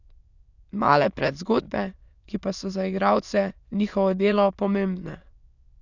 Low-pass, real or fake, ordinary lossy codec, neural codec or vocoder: 7.2 kHz; fake; none; autoencoder, 22.05 kHz, a latent of 192 numbers a frame, VITS, trained on many speakers